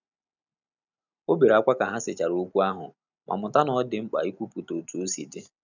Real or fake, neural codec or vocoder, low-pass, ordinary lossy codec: real; none; 7.2 kHz; none